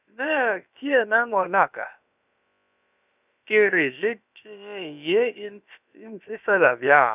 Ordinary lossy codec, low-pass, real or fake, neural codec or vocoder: none; 3.6 kHz; fake; codec, 16 kHz, about 1 kbps, DyCAST, with the encoder's durations